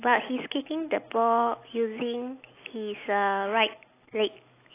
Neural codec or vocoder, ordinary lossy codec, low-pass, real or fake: none; AAC, 24 kbps; 3.6 kHz; real